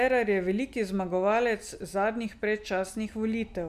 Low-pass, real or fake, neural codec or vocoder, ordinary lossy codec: 14.4 kHz; real; none; none